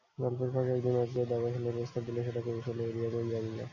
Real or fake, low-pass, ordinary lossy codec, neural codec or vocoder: real; 7.2 kHz; MP3, 48 kbps; none